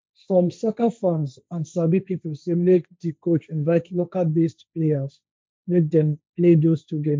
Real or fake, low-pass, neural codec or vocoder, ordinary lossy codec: fake; none; codec, 16 kHz, 1.1 kbps, Voila-Tokenizer; none